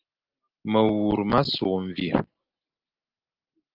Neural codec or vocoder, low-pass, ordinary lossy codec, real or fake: none; 5.4 kHz; Opus, 32 kbps; real